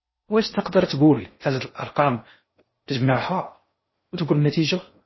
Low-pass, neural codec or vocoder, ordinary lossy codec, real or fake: 7.2 kHz; codec, 16 kHz in and 24 kHz out, 0.6 kbps, FocalCodec, streaming, 4096 codes; MP3, 24 kbps; fake